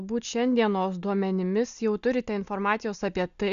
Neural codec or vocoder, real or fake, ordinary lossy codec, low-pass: none; real; MP3, 96 kbps; 7.2 kHz